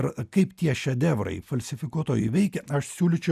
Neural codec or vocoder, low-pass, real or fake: none; 14.4 kHz; real